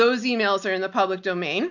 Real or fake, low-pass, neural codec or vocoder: real; 7.2 kHz; none